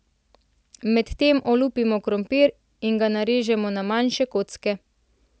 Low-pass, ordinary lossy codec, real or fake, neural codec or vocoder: none; none; real; none